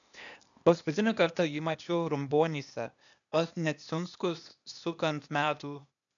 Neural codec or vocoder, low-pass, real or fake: codec, 16 kHz, 0.8 kbps, ZipCodec; 7.2 kHz; fake